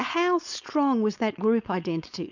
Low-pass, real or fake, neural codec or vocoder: 7.2 kHz; fake; codec, 16 kHz, 4.8 kbps, FACodec